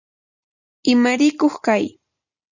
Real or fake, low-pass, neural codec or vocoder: real; 7.2 kHz; none